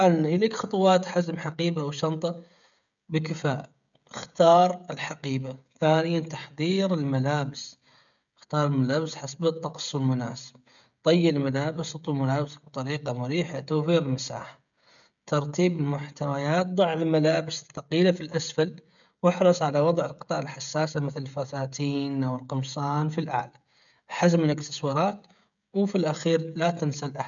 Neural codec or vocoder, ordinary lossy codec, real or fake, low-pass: codec, 16 kHz, 16 kbps, FreqCodec, smaller model; none; fake; 7.2 kHz